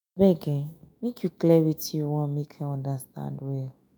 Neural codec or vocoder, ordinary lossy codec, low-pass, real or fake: autoencoder, 48 kHz, 128 numbers a frame, DAC-VAE, trained on Japanese speech; none; none; fake